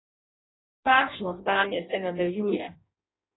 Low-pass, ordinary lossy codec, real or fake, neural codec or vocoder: 7.2 kHz; AAC, 16 kbps; fake; codec, 16 kHz in and 24 kHz out, 0.6 kbps, FireRedTTS-2 codec